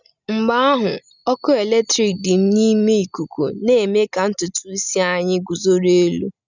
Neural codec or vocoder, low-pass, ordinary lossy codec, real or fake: none; 7.2 kHz; none; real